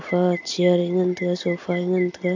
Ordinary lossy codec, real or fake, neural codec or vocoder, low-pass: AAC, 48 kbps; real; none; 7.2 kHz